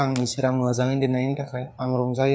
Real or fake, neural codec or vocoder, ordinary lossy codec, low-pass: fake; codec, 16 kHz, 4 kbps, FreqCodec, larger model; none; none